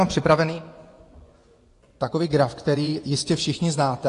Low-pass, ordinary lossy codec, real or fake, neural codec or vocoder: 10.8 kHz; AAC, 48 kbps; fake; vocoder, 24 kHz, 100 mel bands, Vocos